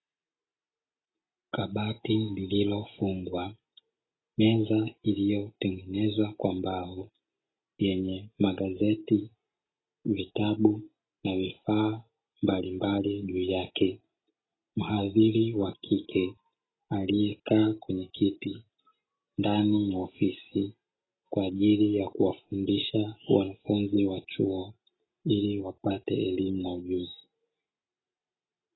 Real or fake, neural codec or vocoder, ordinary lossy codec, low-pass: real; none; AAC, 16 kbps; 7.2 kHz